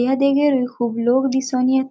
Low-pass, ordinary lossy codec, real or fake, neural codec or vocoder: none; none; real; none